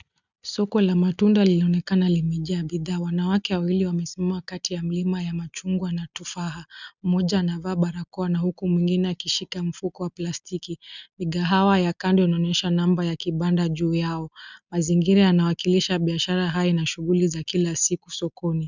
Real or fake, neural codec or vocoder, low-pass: real; none; 7.2 kHz